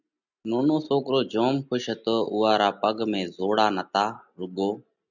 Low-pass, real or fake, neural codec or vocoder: 7.2 kHz; real; none